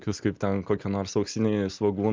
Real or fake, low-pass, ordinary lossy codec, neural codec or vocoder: real; 7.2 kHz; Opus, 32 kbps; none